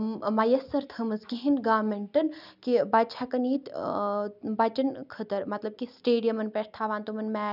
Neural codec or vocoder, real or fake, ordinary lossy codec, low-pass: none; real; none; 5.4 kHz